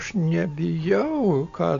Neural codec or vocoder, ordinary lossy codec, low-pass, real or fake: none; MP3, 64 kbps; 7.2 kHz; real